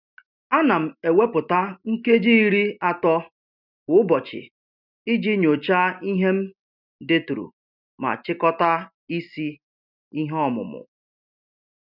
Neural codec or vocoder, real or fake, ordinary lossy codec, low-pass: none; real; none; 5.4 kHz